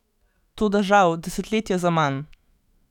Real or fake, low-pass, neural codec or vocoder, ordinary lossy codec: fake; 19.8 kHz; autoencoder, 48 kHz, 128 numbers a frame, DAC-VAE, trained on Japanese speech; none